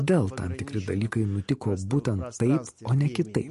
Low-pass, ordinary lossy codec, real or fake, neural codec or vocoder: 14.4 kHz; MP3, 48 kbps; real; none